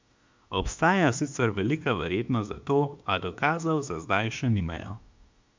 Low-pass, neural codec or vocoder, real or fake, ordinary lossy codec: 7.2 kHz; autoencoder, 48 kHz, 32 numbers a frame, DAC-VAE, trained on Japanese speech; fake; MP3, 64 kbps